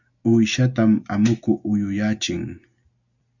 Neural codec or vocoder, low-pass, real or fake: none; 7.2 kHz; real